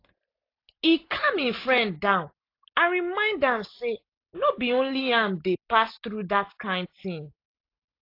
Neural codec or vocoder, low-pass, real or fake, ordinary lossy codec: none; 5.4 kHz; real; AAC, 32 kbps